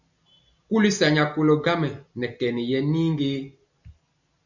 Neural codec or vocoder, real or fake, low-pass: none; real; 7.2 kHz